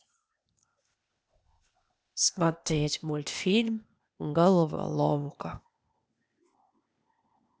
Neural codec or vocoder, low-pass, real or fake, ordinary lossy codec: codec, 16 kHz, 0.8 kbps, ZipCodec; none; fake; none